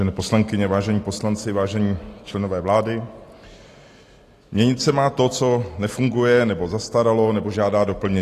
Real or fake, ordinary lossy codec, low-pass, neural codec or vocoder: real; AAC, 48 kbps; 14.4 kHz; none